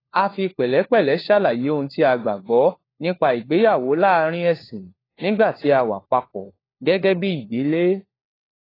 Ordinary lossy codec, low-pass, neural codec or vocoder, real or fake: AAC, 24 kbps; 5.4 kHz; codec, 16 kHz, 4 kbps, FunCodec, trained on LibriTTS, 50 frames a second; fake